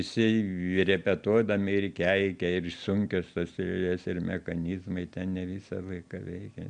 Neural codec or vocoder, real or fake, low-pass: none; real; 9.9 kHz